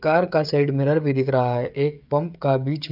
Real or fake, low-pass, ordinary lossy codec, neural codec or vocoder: fake; 5.4 kHz; none; codec, 16 kHz, 16 kbps, FreqCodec, smaller model